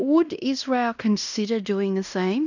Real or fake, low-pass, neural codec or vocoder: fake; 7.2 kHz; codec, 16 kHz in and 24 kHz out, 0.9 kbps, LongCat-Audio-Codec, fine tuned four codebook decoder